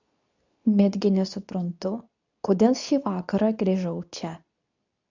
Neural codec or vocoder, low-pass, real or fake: codec, 24 kHz, 0.9 kbps, WavTokenizer, medium speech release version 2; 7.2 kHz; fake